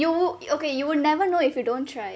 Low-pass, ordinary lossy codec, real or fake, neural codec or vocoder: none; none; real; none